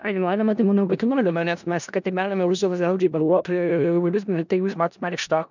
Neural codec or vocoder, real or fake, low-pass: codec, 16 kHz in and 24 kHz out, 0.4 kbps, LongCat-Audio-Codec, four codebook decoder; fake; 7.2 kHz